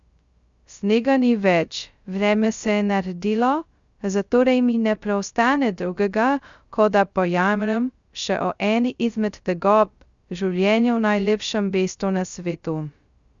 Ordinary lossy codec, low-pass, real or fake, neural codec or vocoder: Opus, 64 kbps; 7.2 kHz; fake; codec, 16 kHz, 0.2 kbps, FocalCodec